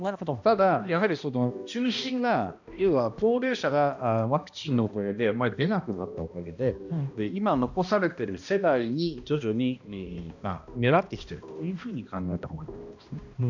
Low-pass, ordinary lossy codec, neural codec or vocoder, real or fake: 7.2 kHz; none; codec, 16 kHz, 1 kbps, X-Codec, HuBERT features, trained on balanced general audio; fake